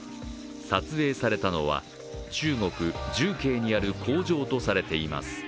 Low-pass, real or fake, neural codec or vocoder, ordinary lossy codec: none; real; none; none